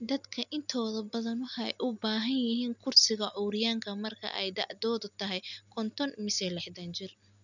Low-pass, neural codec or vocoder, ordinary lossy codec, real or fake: 7.2 kHz; none; none; real